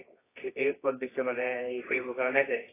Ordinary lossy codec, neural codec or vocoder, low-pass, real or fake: AAC, 16 kbps; codec, 24 kHz, 0.9 kbps, WavTokenizer, medium music audio release; 3.6 kHz; fake